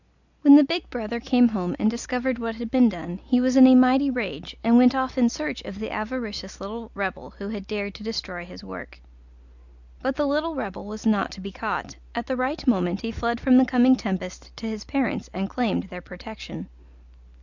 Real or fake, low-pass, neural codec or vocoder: real; 7.2 kHz; none